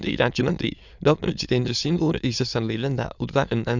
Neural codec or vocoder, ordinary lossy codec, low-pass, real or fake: autoencoder, 22.05 kHz, a latent of 192 numbers a frame, VITS, trained on many speakers; none; 7.2 kHz; fake